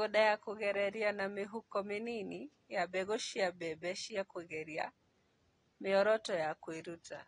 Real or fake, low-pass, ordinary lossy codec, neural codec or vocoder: real; 9.9 kHz; AAC, 32 kbps; none